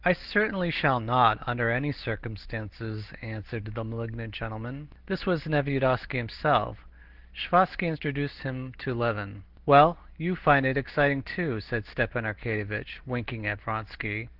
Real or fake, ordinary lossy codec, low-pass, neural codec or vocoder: real; Opus, 16 kbps; 5.4 kHz; none